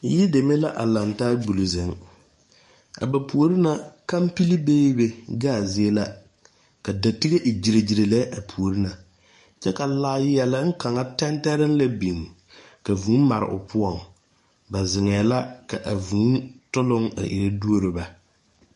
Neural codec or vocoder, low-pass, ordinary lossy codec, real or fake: codec, 44.1 kHz, 7.8 kbps, DAC; 14.4 kHz; MP3, 48 kbps; fake